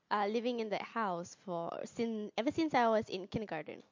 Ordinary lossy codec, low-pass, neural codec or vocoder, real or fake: MP3, 48 kbps; 7.2 kHz; none; real